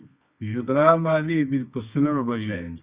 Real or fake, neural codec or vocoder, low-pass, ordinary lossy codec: fake; codec, 24 kHz, 0.9 kbps, WavTokenizer, medium music audio release; 3.6 kHz; Opus, 64 kbps